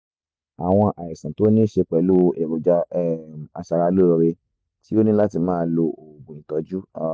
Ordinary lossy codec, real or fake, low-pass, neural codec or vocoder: none; real; none; none